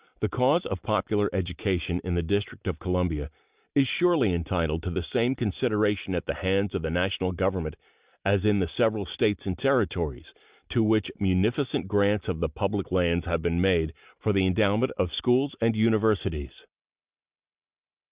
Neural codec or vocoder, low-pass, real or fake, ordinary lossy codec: none; 3.6 kHz; real; Opus, 64 kbps